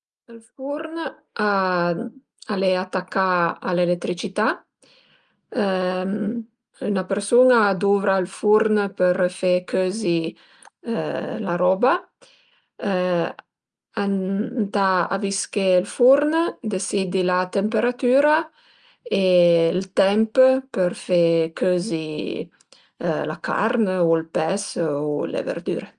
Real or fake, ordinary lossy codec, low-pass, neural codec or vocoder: real; Opus, 32 kbps; 9.9 kHz; none